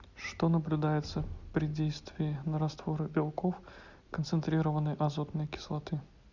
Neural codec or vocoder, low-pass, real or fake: none; 7.2 kHz; real